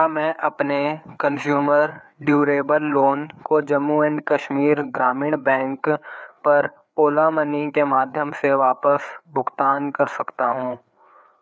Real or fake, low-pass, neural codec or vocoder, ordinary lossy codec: fake; none; codec, 16 kHz, 4 kbps, FreqCodec, larger model; none